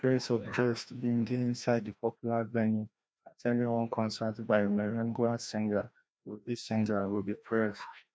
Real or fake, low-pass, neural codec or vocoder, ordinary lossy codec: fake; none; codec, 16 kHz, 1 kbps, FreqCodec, larger model; none